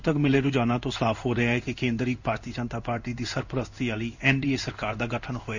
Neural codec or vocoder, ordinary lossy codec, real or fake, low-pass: codec, 16 kHz in and 24 kHz out, 1 kbps, XY-Tokenizer; none; fake; 7.2 kHz